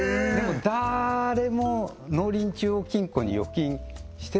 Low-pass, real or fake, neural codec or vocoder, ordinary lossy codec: none; real; none; none